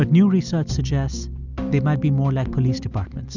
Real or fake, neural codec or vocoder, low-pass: real; none; 7.2 kHz